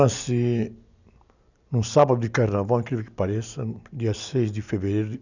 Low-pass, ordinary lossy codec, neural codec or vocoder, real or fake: 7.2 kHz; none; none; real